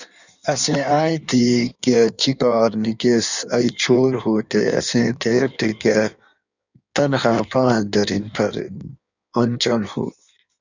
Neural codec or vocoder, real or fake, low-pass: codec, 16 kHz in and 24 kHz out, 1.1 kbps, FireRedTTS-2 codec; fake; 7.2 kHz